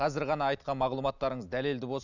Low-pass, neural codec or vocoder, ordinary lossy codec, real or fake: 7.2 kHz; none; none; real